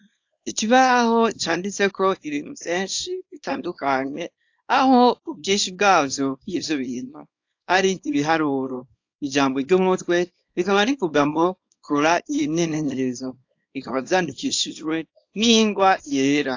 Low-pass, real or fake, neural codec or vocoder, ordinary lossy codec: 7.2 kHz; fake; codec, 24 kHz, 0.9 kbps, WavTokenizer, small release; AAC, 48 kbps